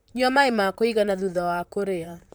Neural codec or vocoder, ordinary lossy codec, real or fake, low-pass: vocoder, 44.1 kHz, 128 mel bands, Pupu-Vocoder; none; fake; none